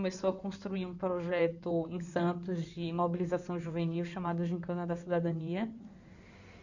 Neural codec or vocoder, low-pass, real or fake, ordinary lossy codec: codec, 16 kHz in and 24 kHz out, 2.2 kbps, FireRedTTS-2 codec; 7.2 kHz; fake; none